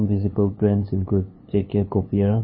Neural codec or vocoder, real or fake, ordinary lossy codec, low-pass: codec, 16 kHz, 2 kbps, FunCodec, trained on LibriTTS, 25 frames a second; fake; MP3, 24 kbps; 7.2 kHz